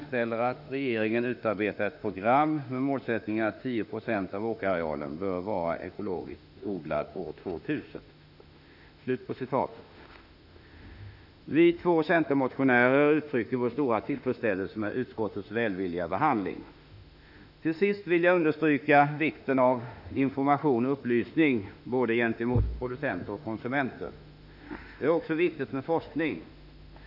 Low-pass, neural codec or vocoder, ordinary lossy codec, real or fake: 5.4 kHz; autoencoder, 48 kHz, 32 numbers a frame, DAC-VAE, trained on Japanese speech; none; fake